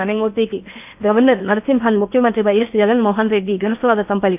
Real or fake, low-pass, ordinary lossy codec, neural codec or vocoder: fake; 3.6 kHz; none; codec, 16 kHz in and 24 kHz out, 0.8 kbps, FocalCodec, streaming, 65536 codes